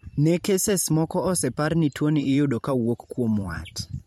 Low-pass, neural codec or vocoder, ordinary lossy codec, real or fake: 19.8 kHz; vocoder, 44.1 kHz, 128 mel bands every 512 samples, BigVGAN v2; MP3, 64 kbps; fake